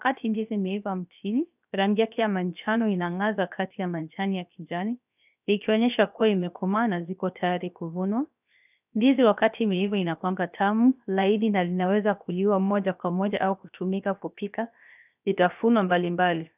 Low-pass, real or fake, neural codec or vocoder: 3.6 kHz; fake; codec, 16 kHz, 0.7 kbps, FocalCodec